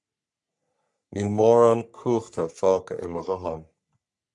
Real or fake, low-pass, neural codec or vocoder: fake; 10.8 kHz; codec, 44.1 kHz, 3.4 kbps, Pupu-Codec